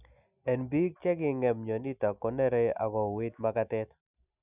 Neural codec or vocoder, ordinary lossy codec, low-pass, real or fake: none; none; 3.6 kHz; real